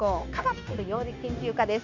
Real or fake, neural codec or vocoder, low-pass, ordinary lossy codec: fake; codec, 16 kHz, 0.9 kbps, LongCat-Audio-Codec; 7.2 kHz; none